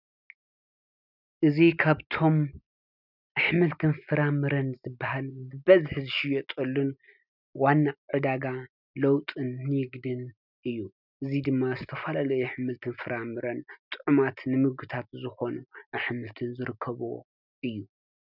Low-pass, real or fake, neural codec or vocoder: 5.4 kHz; real; none